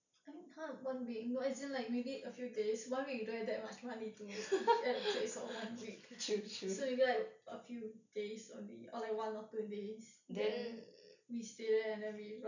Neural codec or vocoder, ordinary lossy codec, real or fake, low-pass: none; none; real; 7.2 kHz